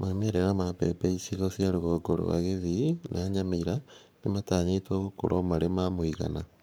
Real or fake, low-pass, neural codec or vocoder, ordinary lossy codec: fake; none; codec, 44.1 kHz, 7.8 kbps, Pupu-Codec; none